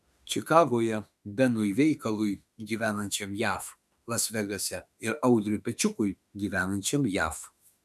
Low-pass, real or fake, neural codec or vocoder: 14.4 kHz; fake; autoencoder, 48 kHz, 32 numbers a frame, DAC-VAE, trained on Japanese speech